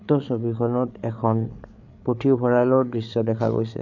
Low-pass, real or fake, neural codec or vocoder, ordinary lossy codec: 7.2 kHz; fake; codec, 16 kHz, 8 kbps, FreqCodec, larger model; none